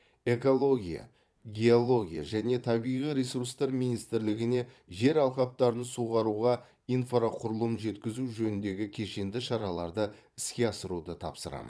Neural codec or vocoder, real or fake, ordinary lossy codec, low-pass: vocoder, 22.05 kHz, 80 mel bands, Vocos; fake; none; none